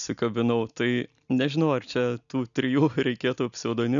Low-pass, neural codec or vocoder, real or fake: 7.2 kHz; none; real